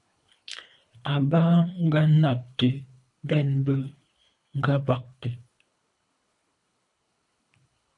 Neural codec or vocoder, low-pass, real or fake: codec, 24 kHz, 3 kbps, HILCodec; 10.8 kHz; fake